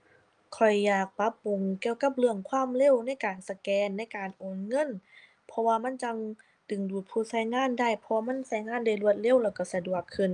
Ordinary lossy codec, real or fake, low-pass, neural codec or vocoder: Opus, 32 kbps; real; 9.9 kHz; none